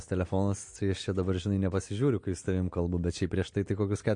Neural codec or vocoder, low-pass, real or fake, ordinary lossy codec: none; 9.9 kHz; real; MP3, 48 kbps